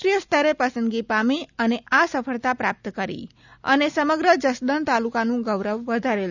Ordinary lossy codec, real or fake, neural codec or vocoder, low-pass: none; real; none; 7.2 kHz